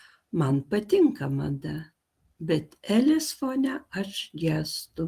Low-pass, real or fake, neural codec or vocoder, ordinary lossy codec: 14.4 kHz; real; none; Opus, 24 kbps